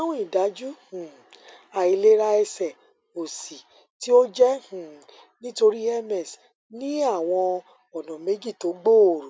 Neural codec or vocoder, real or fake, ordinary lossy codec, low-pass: none; real; none; none